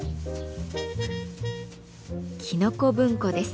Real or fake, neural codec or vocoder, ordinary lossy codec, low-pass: real; none; none; none